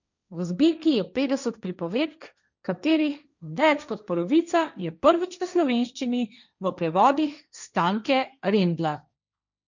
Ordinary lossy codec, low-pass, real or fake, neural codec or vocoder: none; 7.2 kHz; fake; codec, 16 kHz, 1.1 kbps, Voila-Tokenizer